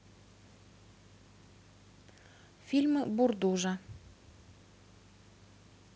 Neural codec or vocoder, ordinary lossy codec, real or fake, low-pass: none; none; real; none